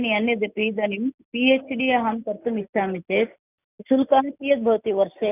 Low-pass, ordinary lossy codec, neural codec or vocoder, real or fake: 3.6 kHz; none; none; real